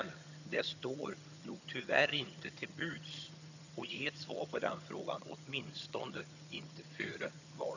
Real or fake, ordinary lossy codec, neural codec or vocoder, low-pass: fake; none; vocoder, 22.05 kHz, 80 mel bands, HiFi-GAN; 7.2 kHz